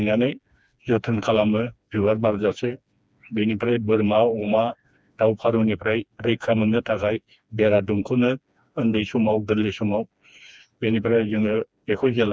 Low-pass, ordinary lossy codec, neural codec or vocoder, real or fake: none; none; codec, 16 kHz, 2 kbps, FreqCodec, smaller model; fake